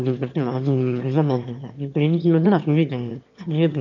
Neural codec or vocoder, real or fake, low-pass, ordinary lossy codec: autoencoder, 22.05 kHz, a latent of 192 numbers a frame, VITS, trained on one speaker; fake; 7.2 kHz; none